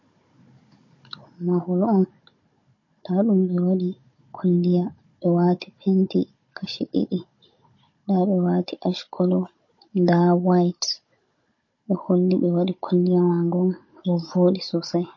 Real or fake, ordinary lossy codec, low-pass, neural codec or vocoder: fake; MP3, 32 kbps; 7.2 kHz; codec, 16 kHz, 16 kbps, FunCodec, trained on Chinese and English, 50 frames a second